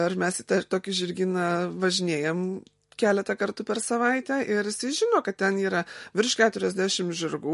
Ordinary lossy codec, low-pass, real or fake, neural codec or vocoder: MP3, 48 kbps; 14.4 kHz; real; none